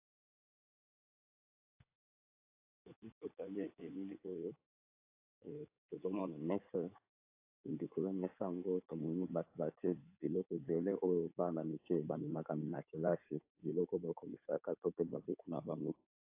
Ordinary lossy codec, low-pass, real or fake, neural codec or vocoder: AAC, 32 kbps; 3.6 kHz; fake; codec, 16 kHz in and 24 kHz out, 2.2 kbps, FireRedTTS-2 codec